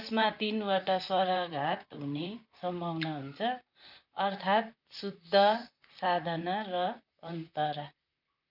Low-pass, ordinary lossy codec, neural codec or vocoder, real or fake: 5.4 kHz; none; vocoder, 44.1 kHz, 128 mel bands, Pupu-Vocoder; fake